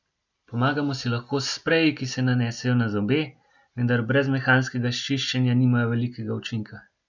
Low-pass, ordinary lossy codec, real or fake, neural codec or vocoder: 7.2 kHz; none; real; none